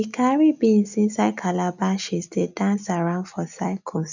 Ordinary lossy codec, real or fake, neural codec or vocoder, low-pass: none; real; none; 7.2 kHz